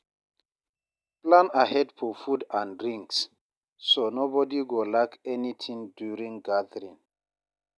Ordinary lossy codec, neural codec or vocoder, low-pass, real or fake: none; none; none; real